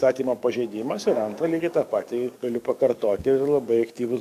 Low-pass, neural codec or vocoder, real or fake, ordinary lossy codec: 14.4 kHz; codec, 44.1 kHz, 7.8 kbps, DAC; fake; AAC, 96 kbps